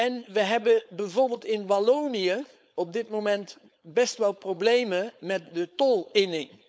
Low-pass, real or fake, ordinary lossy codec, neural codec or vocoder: none; fake; none; codec, 16 kHz, 4.8 kbps, FACodec